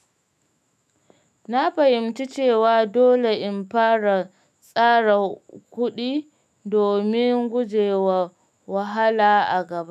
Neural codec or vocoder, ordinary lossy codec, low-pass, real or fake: autoencoder, 48 kHz, 128 numbers a frame, DAC-VAE, trained on Japanese speech; none; 14.4 kHz; fake